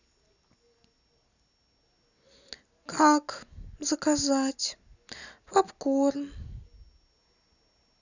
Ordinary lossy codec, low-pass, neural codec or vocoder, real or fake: none; 7.2 kHz; none; real